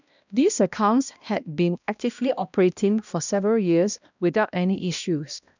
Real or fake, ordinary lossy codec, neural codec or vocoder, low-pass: fake; none; codec, 16 kHz, 1 kbps, X-Codec, HuBERT features, trained on balanced general audio; 7.2 kHz